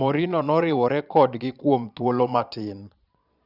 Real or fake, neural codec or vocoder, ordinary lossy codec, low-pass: fake; vocoder, 22.05 kHz, 80 mel bands, Vocos; none; 5.4 kHz